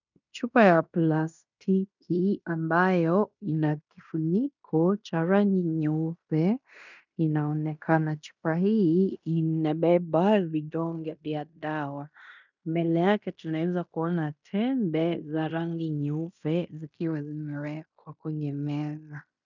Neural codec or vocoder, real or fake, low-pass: codec, 16 kHz in and 24 kHz out, 0.9 kbps, LongCat-Audio-Codec, fine tuned four codebook decoder; fake; 7.2 kHz